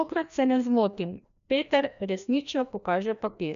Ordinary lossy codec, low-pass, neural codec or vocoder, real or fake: none; 7.2 kHz; codec, 16 kHz, 1 kbps, FreqCodec, larger model; fake